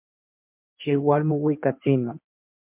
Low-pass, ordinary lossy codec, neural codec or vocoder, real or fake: 3.6 kHz; MP3, 32 kbps; codec, 16 kHz in and 24 kHz out, 1.1 kbps, FireRedTTS-2 codec; fake